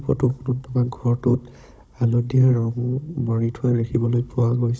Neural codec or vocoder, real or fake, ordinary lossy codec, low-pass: codec, 16 kHz, 16 kbps, FunCodec, trained on Chinese and English, 50 frames a second; fake; none; none